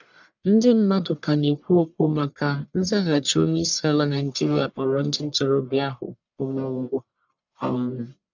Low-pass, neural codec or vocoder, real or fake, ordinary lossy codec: 7.2 kHz; codec, 44.1 kHz, 1.7 kbps, Pupu-Codec; fake; none